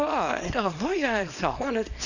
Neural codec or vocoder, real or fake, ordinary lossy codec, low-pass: codec, 24 kHz, 0.9 kbps, WavTokenizer, small release; fake; none; 7.2 kHz